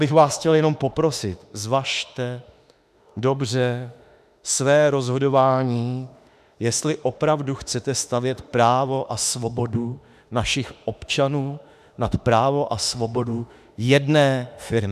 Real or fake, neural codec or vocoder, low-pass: fake; autoencoder, 48 kHz, 32 numbers a frame, DAC-VAE, trained on Japanese speech; 14.4 kHz